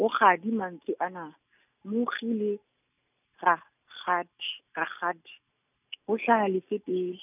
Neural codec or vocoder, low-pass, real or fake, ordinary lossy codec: none; 3.6 kHz; real; none